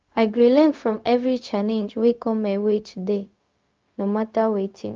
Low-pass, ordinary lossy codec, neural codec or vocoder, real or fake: 7.2 kHz; Opus, 24 kbps; codec, 16 kHz, 0.4 kbps, LongCat-Audio-Codec; fake